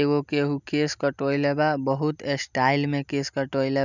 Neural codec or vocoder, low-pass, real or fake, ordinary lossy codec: none; 7.2 kHz; real; none